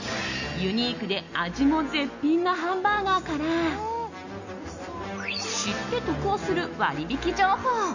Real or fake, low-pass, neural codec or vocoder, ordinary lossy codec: real; 7.2 kHz; none; none